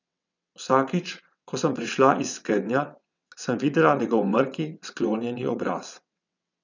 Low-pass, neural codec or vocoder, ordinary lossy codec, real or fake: 7.2 kHz; vocoder, 22.05 kHz, 80 mel bands, WaveNeXt; none; fake